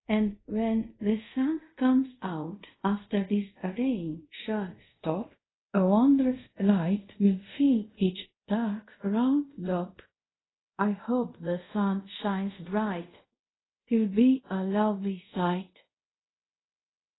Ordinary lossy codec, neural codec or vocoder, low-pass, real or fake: AAC, 16 kbps; codec, 24 kHz, 0.5 kbps, DualCodec; 7.2 kHz; fake